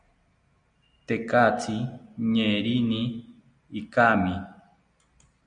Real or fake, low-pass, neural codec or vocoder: real; 9.9 kHz; none